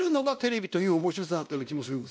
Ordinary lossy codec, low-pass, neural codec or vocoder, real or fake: none; none; codec, 16 kHz, 1 kbps, X-Codec, WavLM features, trained on Multilingual LibriSpeech; fake